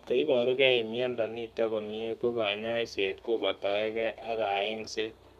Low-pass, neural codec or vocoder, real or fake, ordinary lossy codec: 14.4 kHz; codec, 32 kHz, 1.9 kbps, SNAC; fake; none